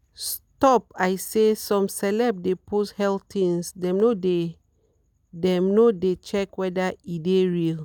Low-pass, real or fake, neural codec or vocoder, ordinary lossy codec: 19.8 kHz; real; none; none